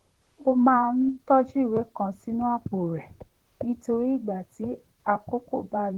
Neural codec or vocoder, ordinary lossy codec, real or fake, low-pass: vocoder, 44.1 kHz, 128 mel bands, Pupu-Vocoder; Opus, 16 kbps; fake; 19.8 kHz